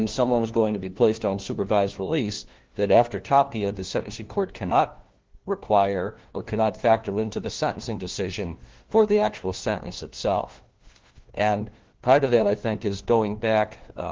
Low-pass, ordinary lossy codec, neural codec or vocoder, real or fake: 7.2 kHz; Opus, 16 kbps; codec, 16 kHz, 1 kbps, FunCodec, trained on LibriTTS, 50 frames a second; fake